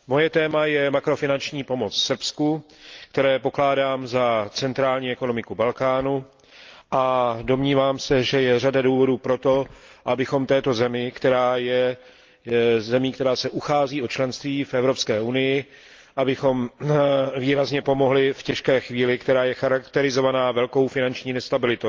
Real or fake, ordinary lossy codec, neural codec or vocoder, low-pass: real; Opus, 24 kbps; none; 7.2 kHz